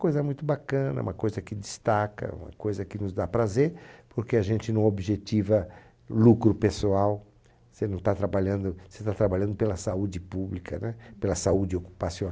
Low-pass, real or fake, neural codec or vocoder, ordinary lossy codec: none; real; none; none